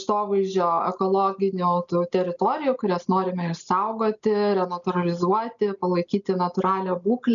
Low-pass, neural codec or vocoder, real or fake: 7.2 kHz; none; real